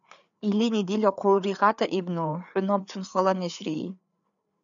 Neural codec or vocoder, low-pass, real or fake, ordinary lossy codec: codec, 16 kHz, 4 kbps, FreqCodec, larger model; 7.2 kHz; fake; MP3, 96 kbps